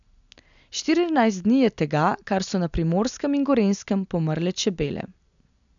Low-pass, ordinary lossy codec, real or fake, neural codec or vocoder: 7.2 kHz; none; real; none